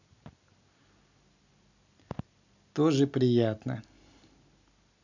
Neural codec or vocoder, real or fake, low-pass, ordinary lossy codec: none; real; 7.2 kHz; none